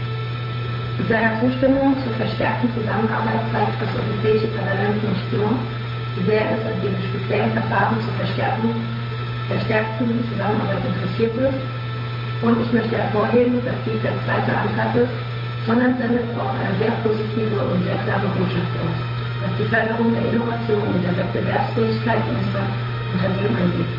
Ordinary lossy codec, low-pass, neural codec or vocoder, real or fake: MP3, 24 kbps; 5.4 kHz; codec, 16 kHz, 8 kbps, FunCodec, trained on Chinese and English, 25 frames a second; fake